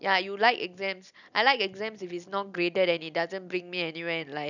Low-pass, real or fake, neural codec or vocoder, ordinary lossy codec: 7.2 kHz; real; none; none